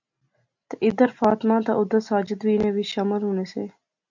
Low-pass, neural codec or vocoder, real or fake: 7.2 kHz; none; real